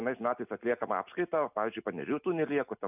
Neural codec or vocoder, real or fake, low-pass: none; real; 3.6 kHz